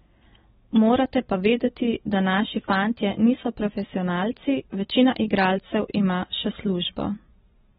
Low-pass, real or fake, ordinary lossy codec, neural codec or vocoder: 19.8 kHz; real; AAC, 16 kbps; none